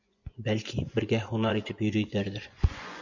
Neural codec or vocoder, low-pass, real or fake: vocoder, 24 kHz, 100 mel bands, Vocos; 7.2 kHz; fake